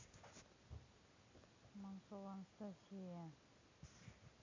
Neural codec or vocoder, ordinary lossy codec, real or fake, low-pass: none; MP3, 64 kbps; real; 7.2 kHz